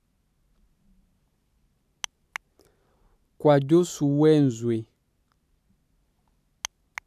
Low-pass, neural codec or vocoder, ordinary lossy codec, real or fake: 14.4 kHz; vocoder, 44.1 kHz, 128 mel bands every 512 samples, BigVGAN v2; none; fake